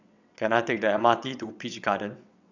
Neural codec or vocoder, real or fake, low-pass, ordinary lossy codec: vocoder, 22.05 kHz, 80 mel bands, WaveNeXt; fake; 7.2 kHz; none